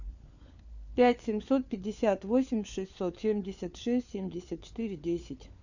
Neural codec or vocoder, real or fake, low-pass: codec, 16 kHz, 4 kbps, FunCodec, trained on LibriTTS, 50 frames a second; fake; 7.2 kHz